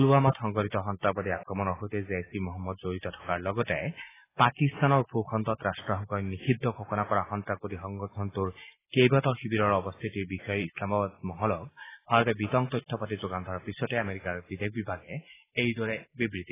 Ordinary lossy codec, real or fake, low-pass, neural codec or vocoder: AAC, 16 kbps; real; 3.6 kHz; none